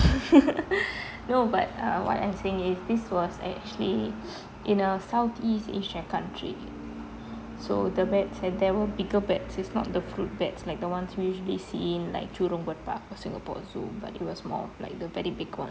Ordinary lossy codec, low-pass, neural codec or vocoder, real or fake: none; none; none; real